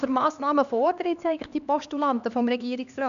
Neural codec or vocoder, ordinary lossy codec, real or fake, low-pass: codec, 16 kHz, 2 kbps, X-Codec, HuBERT features, trained on LibriSpeech; none; fake; 7.2 kHz